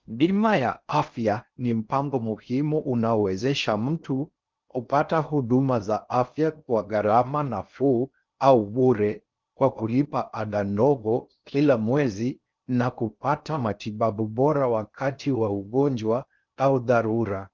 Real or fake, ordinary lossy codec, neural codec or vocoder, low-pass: fake; Opus, 24 kbps; codec, 16 kHz in and 24 kHz out, 0.6 kbps, FocalCodec, streaming, 4096 codes; 7.2 kHz